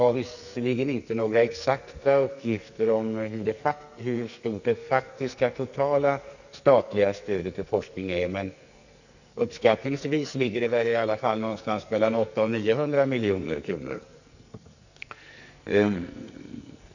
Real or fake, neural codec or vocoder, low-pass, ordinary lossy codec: fake; codec, 44.1 kHz, 2.6 kbps, SNAC; 7.2 kHz; none